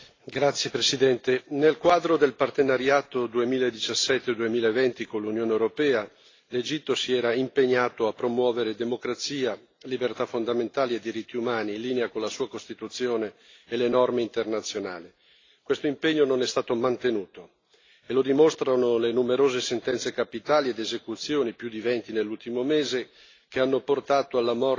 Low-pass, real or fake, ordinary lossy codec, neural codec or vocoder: 7.2 kHz; real; AAC, 32 kbps; none